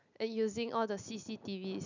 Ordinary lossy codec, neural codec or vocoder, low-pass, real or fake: none; none; 7.2 kHz; real